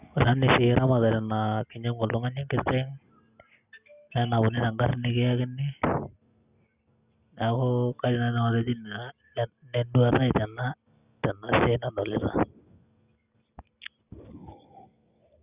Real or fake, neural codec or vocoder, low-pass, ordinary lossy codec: real; none; 3.6 kHz; Opus, 64 kbps